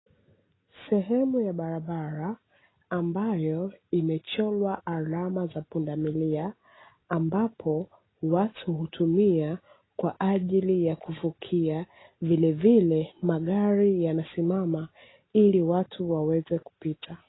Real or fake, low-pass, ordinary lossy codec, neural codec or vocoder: real; 7.2 kHz; AAC, 16 kbps; none